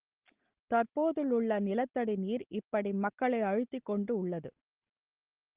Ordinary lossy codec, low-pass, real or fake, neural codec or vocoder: Opus, 16 kbps; 3.6 kHz; real; none